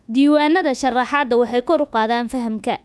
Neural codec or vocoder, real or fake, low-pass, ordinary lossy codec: codec, 24 kHz, 1.2 kbps, DualCodec; fake; none; none